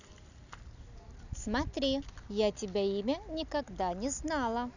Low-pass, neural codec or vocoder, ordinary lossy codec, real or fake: 7.2 kHz; none; none; real